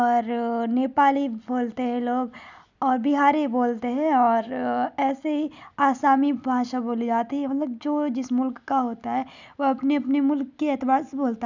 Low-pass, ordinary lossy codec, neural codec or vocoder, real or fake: 7.2 kHz; none; none; real